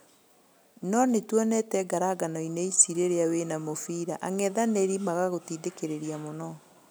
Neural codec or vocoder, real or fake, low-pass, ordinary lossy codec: none; real; none; none